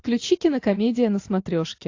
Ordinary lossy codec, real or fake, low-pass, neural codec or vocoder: AAC, 48 kbps; real; 7.2 kHz; none